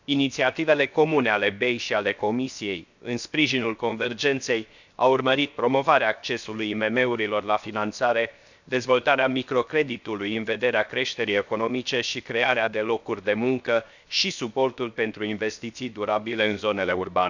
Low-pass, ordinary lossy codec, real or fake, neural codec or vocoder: 7.2 kHz; none; fake; codec, 16 kHz, 0.7 kbps, FocalCodec